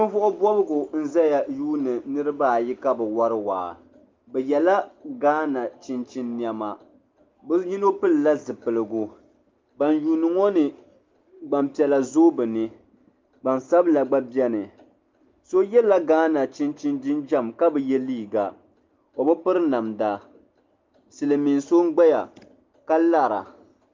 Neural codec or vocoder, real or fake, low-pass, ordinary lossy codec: none; real; 7.2 kHz; Opus, 24 kbps